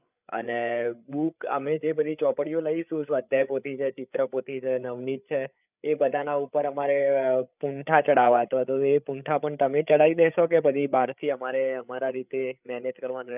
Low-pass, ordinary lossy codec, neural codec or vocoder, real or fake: 3.6 kHz; none; codec, 16 kHz, 8 kbps, FreqCodec, larger model; fake